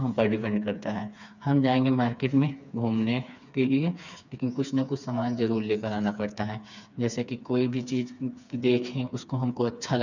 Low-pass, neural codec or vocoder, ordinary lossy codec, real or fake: 7.2 kHz; codec, 16 kHz, 4 kbps, FreqCodec, smaller model; none; fake